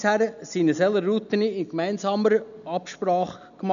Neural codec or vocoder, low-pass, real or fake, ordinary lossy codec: none; 7.2 kHz; real; none